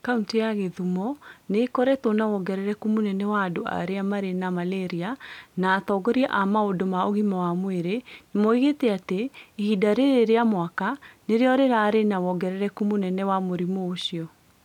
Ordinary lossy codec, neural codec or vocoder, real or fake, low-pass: none; none; real; 19.8 kHz